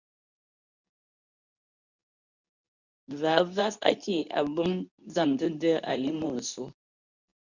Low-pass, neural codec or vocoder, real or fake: 7.2 kHz; codec, 24 kHz, 0.9 kbps, WavTokenizer, medium speech release version 2; fake